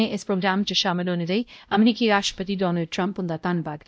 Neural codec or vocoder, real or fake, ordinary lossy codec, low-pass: codec, 16 kHz, 0.5 kbps, X-Codec, WavLM features, trained on Multilingual LibriSpeech; fake; none; none